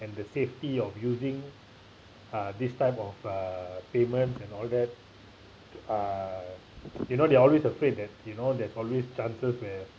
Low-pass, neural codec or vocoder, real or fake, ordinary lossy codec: none; none; real; none